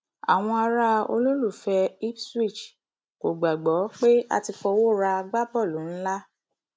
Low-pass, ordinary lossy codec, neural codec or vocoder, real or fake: none; none; none; real